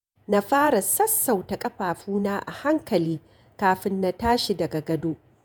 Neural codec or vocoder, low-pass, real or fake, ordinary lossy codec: vocoder, 48 kHz, 128 mel bands, Vocos; none; fake; none